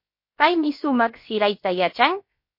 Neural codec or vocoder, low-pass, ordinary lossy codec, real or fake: codec, 16 kHz, about 1 kbps, DyCAST, with the encoder's durations; 5.4 kHz; MP3, 32 kbps; fake